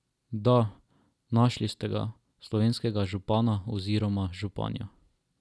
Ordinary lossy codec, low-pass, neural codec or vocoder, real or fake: none; none; none; real